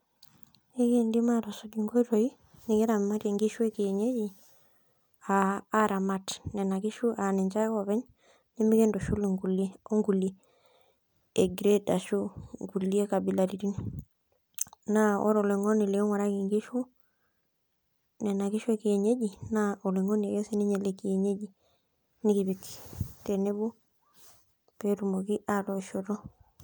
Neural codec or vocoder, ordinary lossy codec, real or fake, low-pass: none; none; real; none